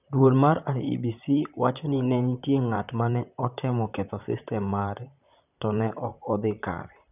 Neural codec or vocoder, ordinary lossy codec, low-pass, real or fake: vocoder, 44.1 kHz, 128 mel bands, Pupu-Vocoder; none; 3.6 kHz; fake